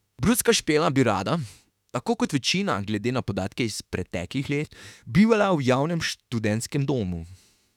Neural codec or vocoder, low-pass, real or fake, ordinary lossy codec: autoencoder, 48 kHz, 32 numbers a frame, DAC-VAE, trained on Japanese speech; 19.8 kHz; fake; none